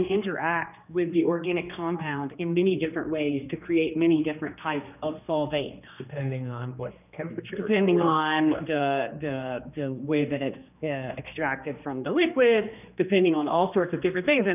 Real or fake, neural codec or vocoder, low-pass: fake; codec, 16 kHz, 2 kbps, X-Codec, HuBERT features, trained on general audio; 3.6 kHz